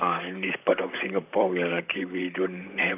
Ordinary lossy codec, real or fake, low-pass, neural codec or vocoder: none; fake; 3.6 kHz; vocoder, 44.1 kHz, 128 mel bands, Pupu-Vocoder